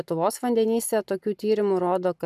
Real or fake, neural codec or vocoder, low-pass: real; none; 14.4 kHz